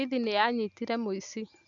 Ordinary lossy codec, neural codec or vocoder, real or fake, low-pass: none; none; real; 7.2 kHz